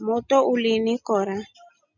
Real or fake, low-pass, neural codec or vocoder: real; 7.2 kHz; none